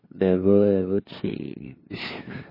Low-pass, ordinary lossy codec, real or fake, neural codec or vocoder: 5.4 kHz; MP3, 24 kbps; fake; codec, 16 kHz, 2 kbps, FunCodec, trained on LibriTTS, 25 frames a second